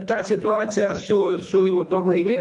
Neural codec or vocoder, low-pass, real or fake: codec, 24 kHz, 1.5 kbps, HILCodec; 10.8 kHz; fake